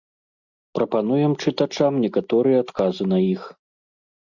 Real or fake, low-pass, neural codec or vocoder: real; 7.2 kHz; none